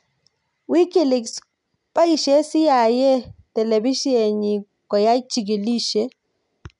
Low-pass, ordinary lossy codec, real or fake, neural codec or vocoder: 10.8 kHz; none; real; none